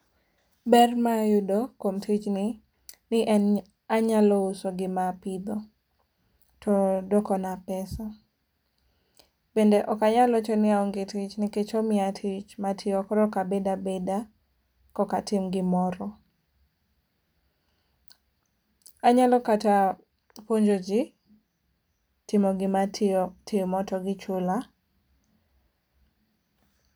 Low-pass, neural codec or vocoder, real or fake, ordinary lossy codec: none; none; real; none